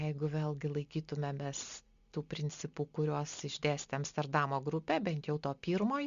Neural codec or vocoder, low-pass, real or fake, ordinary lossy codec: none; 7.2 kHz; real; Opus, 64 kbps